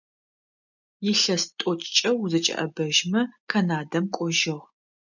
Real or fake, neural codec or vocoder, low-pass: real; none; 7.2 kHz